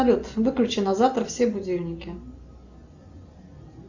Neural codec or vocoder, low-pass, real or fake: none; 7.2 kHz; real